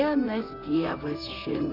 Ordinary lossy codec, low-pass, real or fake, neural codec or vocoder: AAC, 32 kbps; 5.4 kHz; fake; vocoder, 44.1 kHz, 128 mel bands, Pupu-Vocoder